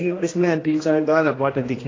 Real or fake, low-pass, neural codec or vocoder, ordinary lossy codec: fake; 7.2 kHz; codec, 16 kHz, 1 kbps, X-Codec, HuBERT features, trained on general audio; AAC, 32 kbps